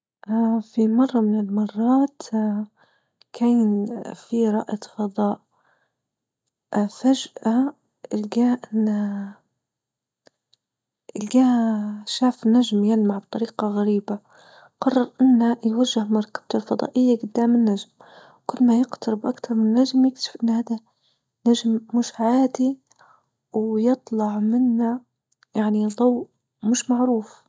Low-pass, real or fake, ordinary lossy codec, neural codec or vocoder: none; real; none; none